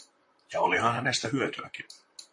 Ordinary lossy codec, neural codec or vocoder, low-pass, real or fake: MP3, 48 kbps; vocoder, 44.1 kHz, 128 mel bands, Pupu-Vocoder; 10.8 kHz; fake